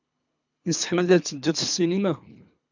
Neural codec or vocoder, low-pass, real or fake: codec, 24 kHz, 3 kbps, HILCodec; 7.2 kHz; fake